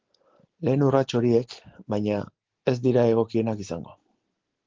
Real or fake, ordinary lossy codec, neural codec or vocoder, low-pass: real; Opus, 16 kbps; none; 7.2 kHz